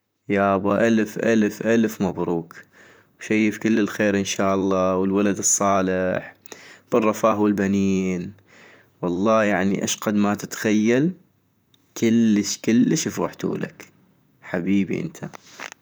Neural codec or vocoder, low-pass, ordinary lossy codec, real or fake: vocoder, 44.1 kHz, 128 mel bands, Pupu-Vocoder; none; none; fake